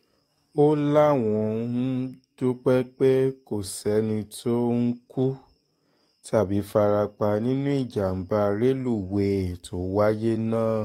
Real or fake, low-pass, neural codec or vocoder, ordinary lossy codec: fake; 19.8 kHz; codec, 44.1 kHz, 7.8 kbps, DAC; AAC, 48 kbps